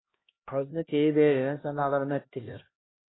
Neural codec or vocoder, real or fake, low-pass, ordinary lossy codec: codec, 16 kHz, 1 kbps, X-Codec, HuBERT features, trained on LibriSpeech; fake; 7.2 kHz; AAC, 16 kbps